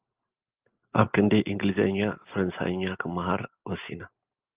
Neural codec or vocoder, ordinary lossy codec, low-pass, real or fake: none; Opus, 24 kbps; 3.6 kHz; real